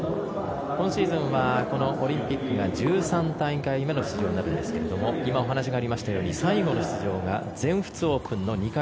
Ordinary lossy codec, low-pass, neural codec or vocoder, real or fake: none; none; none; real